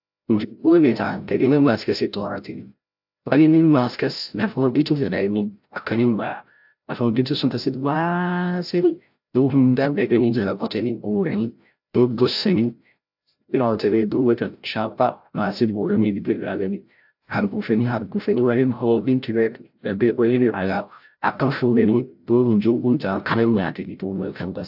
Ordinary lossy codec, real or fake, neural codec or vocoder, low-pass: AAC, 48 kbps; fake; codec, 16 kHz, 0.5 kbps, FreqCodec, larger model; 5.4 kHz